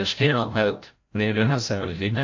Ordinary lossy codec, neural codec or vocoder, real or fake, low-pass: AAC, 48 kbps; codec, 16 kHz, 0.5 kbps, FreqCodec, larger model; fake; 7.2 kHz